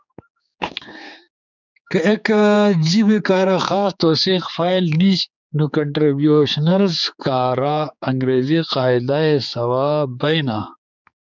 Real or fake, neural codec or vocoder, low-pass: fake; codec, 16 kHz, 4 kbps, X-Codec, HuBERT features, trained on general audio; 7.2 kHz